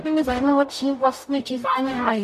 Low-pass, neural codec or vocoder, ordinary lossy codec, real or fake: 14.4 kHz; codec, 44.1 kHz, 0.9 kbps, DAC; MP3, 96 kbps; fake